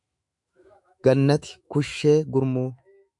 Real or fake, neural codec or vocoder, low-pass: fake; autoencoder, 48 kHz, 128 numbers a frame, DAC-VAE, trained on Japanese speech; 10.8 kHz